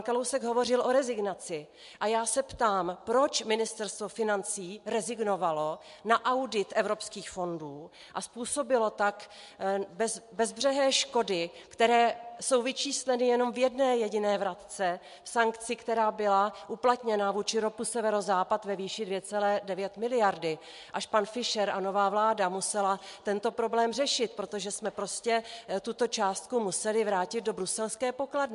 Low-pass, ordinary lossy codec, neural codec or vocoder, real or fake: 10.8 kHz; MP3, 64 kbps; none; real